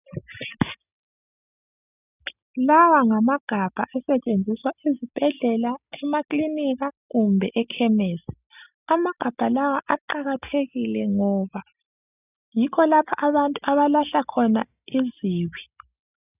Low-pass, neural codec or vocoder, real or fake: 3.6 kHz; none; real